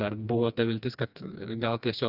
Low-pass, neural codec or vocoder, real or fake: 5.4 kHz; codec, 16 kHz, 2 kbps, FreqCodec, smaller model; fake